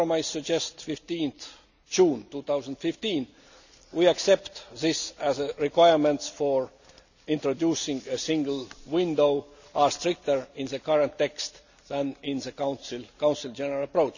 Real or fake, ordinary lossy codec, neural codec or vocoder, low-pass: real; none; none; 7.2 kHz